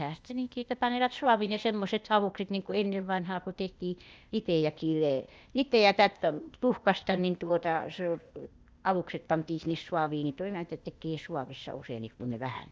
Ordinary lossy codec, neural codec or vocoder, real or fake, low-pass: none; codec, 16 kHz, 0.8 kbps, ZipCodec; fake; none